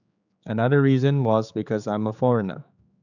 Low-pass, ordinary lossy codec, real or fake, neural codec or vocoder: 7.2 kHz; none; fake; codec, 16 kHz, 4 kbps, X-Codec, HuBERT features, trained on general audio